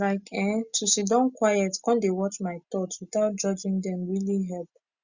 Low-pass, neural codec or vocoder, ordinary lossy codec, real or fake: 7.2 kHz; none; Opus, 64 kbps; real